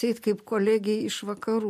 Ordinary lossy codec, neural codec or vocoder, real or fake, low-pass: MP3, 64 kbps; none; real; 14.4 kHz